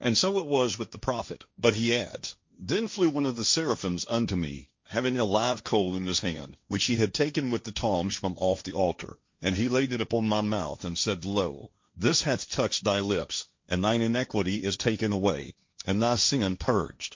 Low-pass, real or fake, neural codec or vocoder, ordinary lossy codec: 7.2 kHz; fake; codec, 16 kHz, 1.1 kbps, Voila-Tokenizer; MP3, 48 kbps